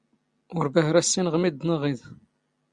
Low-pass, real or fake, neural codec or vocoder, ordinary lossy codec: 9.9 kHz; real; none; Opus, 64 kbps